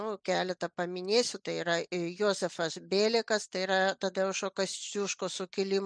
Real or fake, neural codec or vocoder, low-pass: real; none; 9.9 kHz